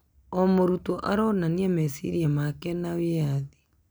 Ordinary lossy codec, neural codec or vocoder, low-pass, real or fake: none; none; none; real